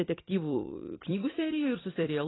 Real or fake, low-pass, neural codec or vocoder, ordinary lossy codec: fake; 7.2 kHz; vocoder, 44.1 kHz, 128 mel bands every 256 samples, BigVGAN v2; AAC, 16 kbps